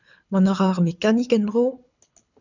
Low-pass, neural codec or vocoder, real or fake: 7.2 kHz; codec, 24 kHz, 6 kbps, HILCodec; fake